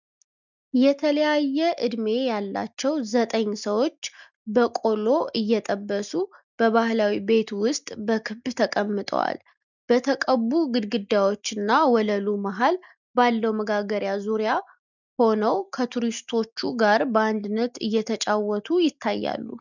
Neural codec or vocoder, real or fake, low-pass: none; real; 7.2 kHz